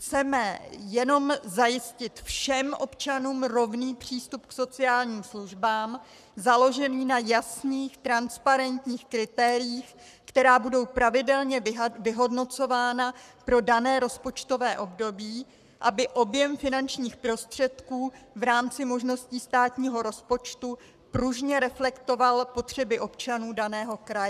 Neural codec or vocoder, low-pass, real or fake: codec, 44.1 kHz, 7.8 kbps, Pupu-Codec; 14.4 kHz; fake